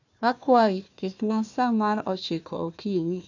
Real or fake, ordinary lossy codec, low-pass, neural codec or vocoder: fake; none; 7.2 kHz; codec, 16 kHz, 1 kbps, FunCodec, trained on Chinese and English, 50 frames a second